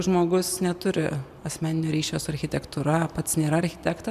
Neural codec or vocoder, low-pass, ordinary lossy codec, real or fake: none; 14.4 kHz; MP3, 96 kbps; real